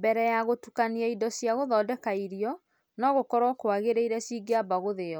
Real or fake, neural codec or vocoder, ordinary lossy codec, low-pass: real; none; none; none